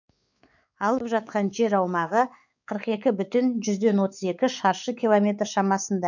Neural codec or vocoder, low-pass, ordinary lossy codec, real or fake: codec, 16 kHz, 4 kbps, X-Codec, WavLM features, trained on Multilingual LibriSpeech; 7.2 kHz; none; fake